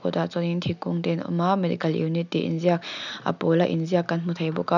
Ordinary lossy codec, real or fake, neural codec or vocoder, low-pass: none; real; none; 7.2 kHz